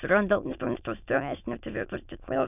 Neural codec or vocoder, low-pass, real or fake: autoencoder, 22.05 kHz, a latent of 192 numbers a frame, VITS, trained on many speakers; 3.6 kHz; fake